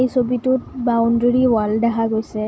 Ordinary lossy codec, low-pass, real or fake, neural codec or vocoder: none; none; real; none